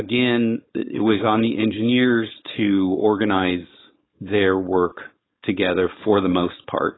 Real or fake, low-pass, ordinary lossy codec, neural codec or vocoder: fake; 7.2 kHz; AAC, 16 kbps; codec, 16 kHz, 4.8 kbps, FACodec